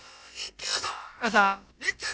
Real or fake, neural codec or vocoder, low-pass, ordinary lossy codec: fake; codec, 16 kHz, about 1 kbps, DyCAST, with the encoder's durations; none; none